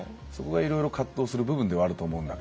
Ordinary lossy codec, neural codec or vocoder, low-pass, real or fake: none; none; none; real